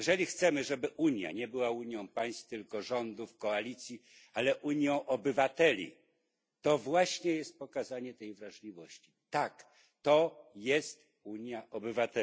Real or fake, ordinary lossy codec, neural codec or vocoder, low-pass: real; none; none; none